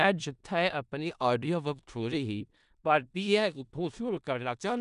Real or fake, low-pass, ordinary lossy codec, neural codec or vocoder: fake; 10.8 kHz; none; codec, 16 kHz in and 24 kHz out, 0.4 kbps, LongCat-Audio-Codec, four codebook decoder